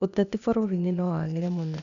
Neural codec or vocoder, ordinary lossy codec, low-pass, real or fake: codec, 16 kHz, 0.8 kbps, ZipCodec; none; 7.2 kHz; fake